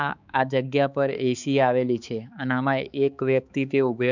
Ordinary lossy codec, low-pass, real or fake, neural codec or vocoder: none; 7.2 kHz; fake; codec, 16 kHz, 4 kbps, X-Codec, HuBERT features, trained on balanced general audio